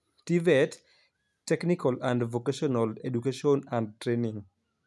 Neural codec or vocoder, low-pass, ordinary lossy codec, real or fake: none; none; none; real